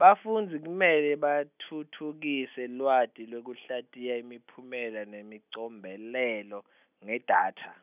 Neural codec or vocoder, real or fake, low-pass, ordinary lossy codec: none; real; 3.6 kHz; none